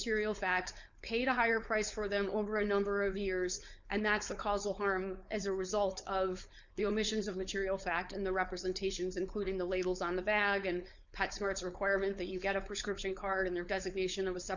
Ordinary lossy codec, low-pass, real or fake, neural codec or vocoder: Opus, 64 kbps; 7.2 kHz; fake; codec, 16 kHz, 4.8 kbps, FACodec